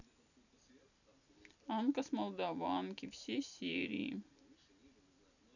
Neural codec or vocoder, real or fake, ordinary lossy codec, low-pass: none; real; none; 7.2 kHz